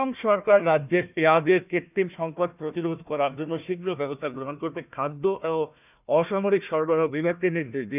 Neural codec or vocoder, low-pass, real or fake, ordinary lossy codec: codec, 16 kHz, 1 kbps, FunCodec, trained on Chinese and English, 50 frames a second; 3.6 kHz; fake; none